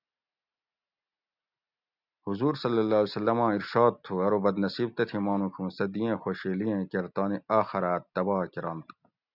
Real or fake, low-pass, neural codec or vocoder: real; 5.4 kHz; none